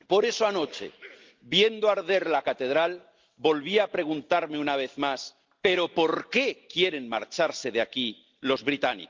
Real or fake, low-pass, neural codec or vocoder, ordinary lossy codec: real; 7.2 kHz; none; Opus, 32 kbps